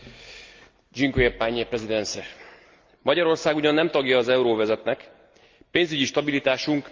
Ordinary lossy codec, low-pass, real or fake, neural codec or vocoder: Opus, 24 kbps; 7.2 kHz; real; none